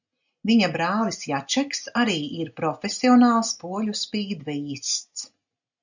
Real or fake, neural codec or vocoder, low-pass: real; none; 7.2 kHz